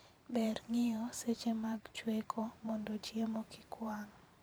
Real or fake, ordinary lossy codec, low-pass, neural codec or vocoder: fake; none; none; vocoder, 44.1 kHz, 128 mel bands every 512 samples, BigVGAN v2